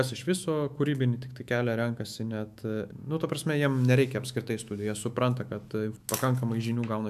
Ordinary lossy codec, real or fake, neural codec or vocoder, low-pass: MP3, 96 kbps; fake; autoencoder, 48 kHz, 128 numbers a frame, DAC-VAE, trained on Japanese speech; 14.4 kHz